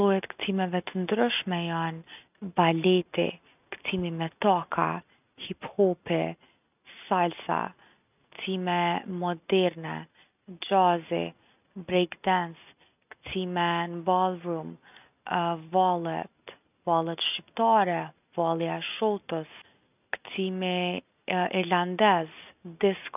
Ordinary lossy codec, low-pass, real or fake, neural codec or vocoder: none; 3.6 kHz; real; none